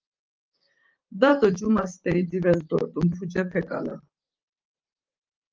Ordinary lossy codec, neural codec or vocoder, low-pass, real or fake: Opus, 24 kbps; vocoder, 44.1 kHz, 128 mel bands, Pupu-Vocoder; 7.2 kHz; fake